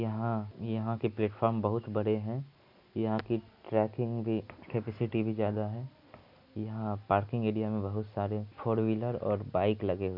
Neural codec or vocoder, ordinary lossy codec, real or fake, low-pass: none; MP3, 48 kbps; real; 5.4 kHz